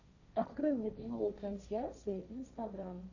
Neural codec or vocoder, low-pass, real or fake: codec, 16 kHz, 1.1 kbps, Voila-Tokenizer; 7.2 kHz; fake